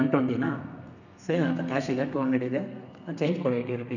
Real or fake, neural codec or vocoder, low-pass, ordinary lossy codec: fake; codec, 32 kHz, 1.9 kbps, SNAC; 7.2 kHz; none